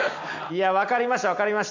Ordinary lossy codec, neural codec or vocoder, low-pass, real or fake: none; none; 7.2 kHz; real